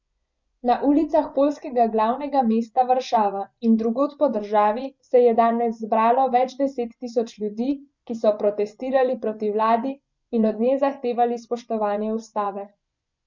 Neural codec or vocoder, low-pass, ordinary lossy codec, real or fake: vocoder, 24 kHz, 100 mel bands, Vocos; 7.2 kHz; MP3, 64 kbps; fake